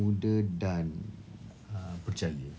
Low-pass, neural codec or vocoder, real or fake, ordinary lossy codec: none; none; real; none